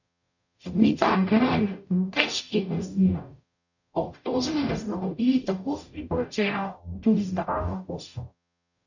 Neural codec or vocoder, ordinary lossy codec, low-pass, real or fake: codec, 44.1 kHz, 0.9 kbps, DAC; none; 7.2 kHz; fake